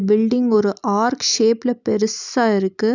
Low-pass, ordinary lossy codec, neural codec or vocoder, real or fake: 7.2 kHz; none; none; real